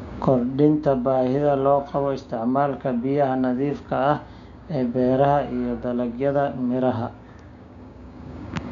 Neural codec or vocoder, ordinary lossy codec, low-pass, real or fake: codec, 16 kHz, 6 kbps, DAC; none; 7.2 kHz; fake